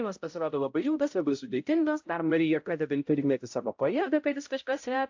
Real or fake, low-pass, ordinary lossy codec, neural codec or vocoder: fake; 7.2 kHz; AAC, 48 kbps; codec, 16 kHz, 0.5 kbps, X-Codec, HuBERT features, trained on balanced general audio